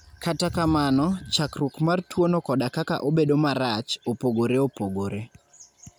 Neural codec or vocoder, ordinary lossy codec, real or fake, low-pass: none; none; real; none